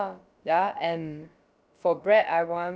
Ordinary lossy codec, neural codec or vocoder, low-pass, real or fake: none; codec, 16 kHz, about 1 kbps, DyCAST, with the encoder's durations; none; fake